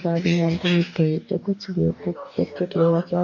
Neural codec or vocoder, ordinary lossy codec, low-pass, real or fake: codec, 44.1 kHz, 2.6 kbps, DAC; none; 7.2 kHz; fake